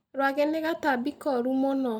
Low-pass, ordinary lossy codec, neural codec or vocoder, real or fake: 19.8 kHz; none; none; real